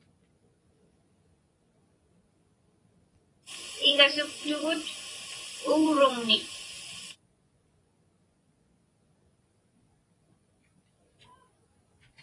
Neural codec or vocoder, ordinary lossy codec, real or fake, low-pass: vocoder, 24 kHz, 100 mel bands, Vocos; AAC, 32 kbps; fake; 10.8 kHz